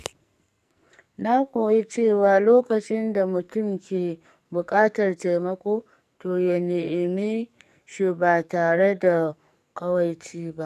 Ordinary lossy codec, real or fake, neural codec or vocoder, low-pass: none; fake; codec, 44.1 kHz, 2.6 kbps, SNAC; 14.4 kHz